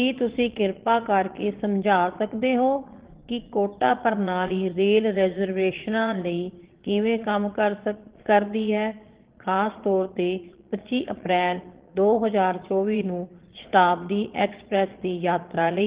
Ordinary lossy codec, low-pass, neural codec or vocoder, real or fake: Opus, 16 kbps; 3.6 kHz; vocoder, 22.05 kHz, 80 mel bands, Vocos; fake